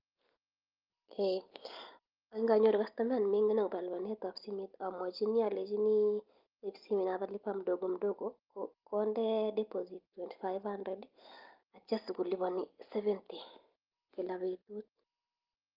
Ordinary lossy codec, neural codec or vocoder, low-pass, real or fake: Opus, 32 kbps; none; 5.4 kHz; real